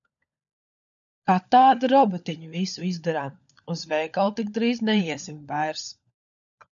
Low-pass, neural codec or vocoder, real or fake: 7.2 kHz; codec, 16 kHz, 16 kbps, FunCodec, trained on LibriTTS, 50 frames a second; fake